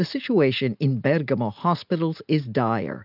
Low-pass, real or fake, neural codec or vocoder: 5.4 kHz; real; none